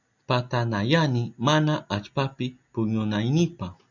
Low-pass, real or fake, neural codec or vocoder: 7.2 kHz; real; none